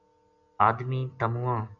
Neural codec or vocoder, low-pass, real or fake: none; 7.2 kHz; real